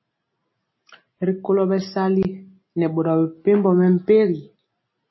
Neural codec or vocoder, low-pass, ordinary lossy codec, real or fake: none; 7.2 kHz; MP3, 24 kbps; real